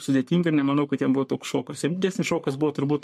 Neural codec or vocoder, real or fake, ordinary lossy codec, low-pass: codec, 44.1 kHz, 3.4 kbps, Pupu-Codec; fake; MP3, 64 kbps; 14.4 kHz